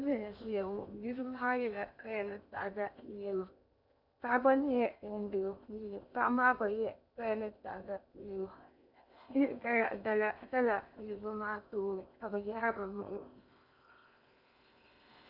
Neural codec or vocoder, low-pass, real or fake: codec, 16 kHz in and 24 kHz out, 0.8 kbps, FocalCodec, streaming, 65536 codes; 5.4 kHz; fake